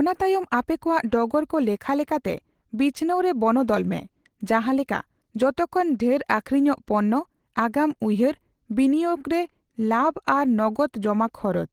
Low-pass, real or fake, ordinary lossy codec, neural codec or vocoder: 19.8 kHz; real; Opus, 16 kbps; none